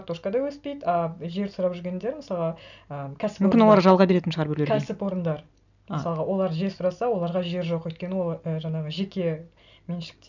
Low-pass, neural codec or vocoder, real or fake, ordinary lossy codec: 7.2 kHz; none; real; none